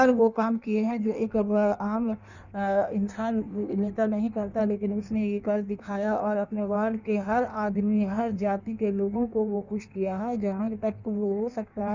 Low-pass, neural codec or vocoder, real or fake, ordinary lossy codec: 7.2 kHz; codec, 16 kHz in and 24 kHz out, 1.1 kbps, FireRedTTS-2 codec; fake; none